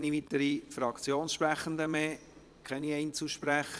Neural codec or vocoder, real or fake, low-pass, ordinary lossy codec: vocoder, 22.05 kHz, 80 mel bands, Vocos; fake; none; none